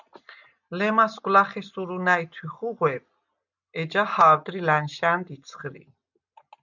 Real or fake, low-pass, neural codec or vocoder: real; 7.2 kHz; none